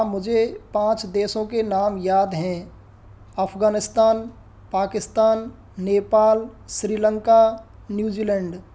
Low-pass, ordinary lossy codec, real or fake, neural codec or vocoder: none; none; real; none